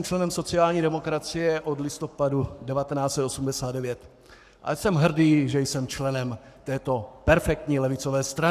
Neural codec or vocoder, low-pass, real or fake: codec, 44.1 kHz, 7.8 kbps, Pupu-Codec; 14.4 kHz; fake